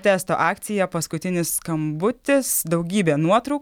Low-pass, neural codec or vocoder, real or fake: 19.8 kHz; none; real